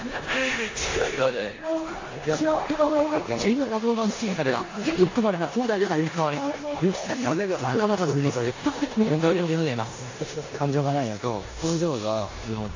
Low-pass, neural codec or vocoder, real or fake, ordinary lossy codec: 7.2 kHz; codec, 16 kHz in and 24 kHz out, 0.9 kbps, LongCat-Audio-Codec, four codebook decoder; fake; AAC, 32 kbps